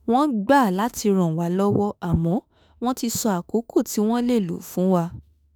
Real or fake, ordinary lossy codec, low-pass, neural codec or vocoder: fake; none; none; autoencoder, 48 kHz, 32 numbers a frame, DAC-VAE, trained on Japanese speech